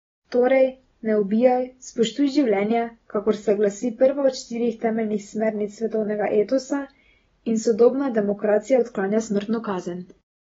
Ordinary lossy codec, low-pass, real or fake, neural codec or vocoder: AAC, 24 kbps; 7.2 kHz; real; none